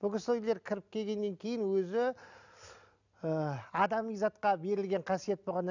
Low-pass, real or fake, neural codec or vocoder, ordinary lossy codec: 7.2 kHz; real; none; none